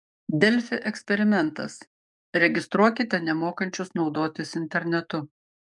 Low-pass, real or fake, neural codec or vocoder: 10.8 kHz; fake; codec, 44.1 kHz, 7.8 kbps, DAC